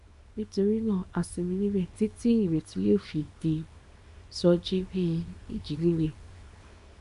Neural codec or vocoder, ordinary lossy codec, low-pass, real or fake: codec, 24 kHz, 0.9 kbps, WavTokenizer, small release; MP3, 64 kbps; 10.8 kHz; fake